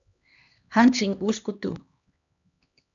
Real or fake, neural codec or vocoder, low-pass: fake; codec, 16 kHz, 2 kbps, X-Codec, HuBERT features, trained on LibriSpeech; 7.2 kHz